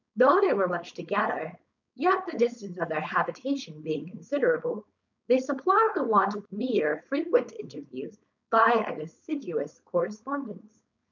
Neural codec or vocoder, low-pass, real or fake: codec, 16 kHz, 4.8 kbps, FACodec; 7.2 kHz; fake